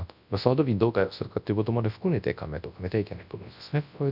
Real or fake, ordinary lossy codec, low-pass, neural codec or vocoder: fake; none; 5.4 kHz; codec, 24 kHz, 0.9 kbps, WavTokenizer, large speech release